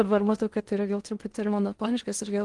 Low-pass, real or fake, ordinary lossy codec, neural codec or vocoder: 10.8 kHz; fake; Opus, 24 kbps; codec, 16 kHz in and 24 kHz out, 0.6 kbps, FocalCodec, streaming, 2048 codes